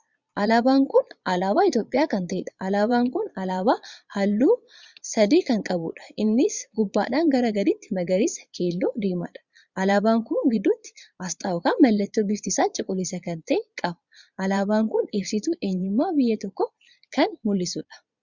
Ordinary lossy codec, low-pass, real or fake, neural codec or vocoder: Opus, 64 kbps; 7.2 kHz; fake; vocoder, 22.05 kHz, 80 mel bands, Vocos